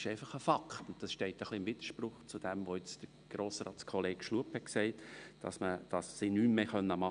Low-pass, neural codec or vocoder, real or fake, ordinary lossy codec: 9.9 kHz; none; real; none